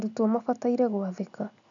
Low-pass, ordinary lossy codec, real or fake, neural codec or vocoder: 7.2 kHz; none; real; none